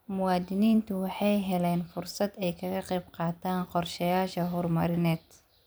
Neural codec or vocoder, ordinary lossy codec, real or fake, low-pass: vocoder, 44.1 kHz, 128 mel bands every 256 samples, BigVGAN v2; none; fake; none